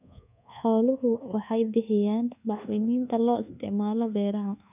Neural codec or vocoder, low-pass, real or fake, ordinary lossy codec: codec, 24 kHz, 1.2 kbps, DualCodec; 3.6 kHz; fake; none